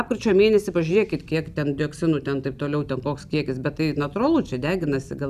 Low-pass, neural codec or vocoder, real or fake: 14.4 kHz; none; real